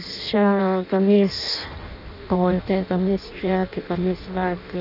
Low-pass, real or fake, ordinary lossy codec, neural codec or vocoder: 5.4 kHz; fake; none; codec, 16 kHz in and 24 kHz out, 0.6 kbps, FireRedTTS-2 codec